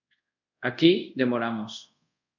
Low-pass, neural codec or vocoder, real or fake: 7.2 kHz; codec, 24 kHz, 0.5 kbps, DualCodec; fake